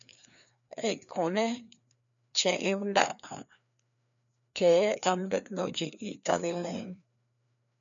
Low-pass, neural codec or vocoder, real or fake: 7.2 kHz; codec, 16 kHz, 2 kbps, FreqCodec, larger model; fake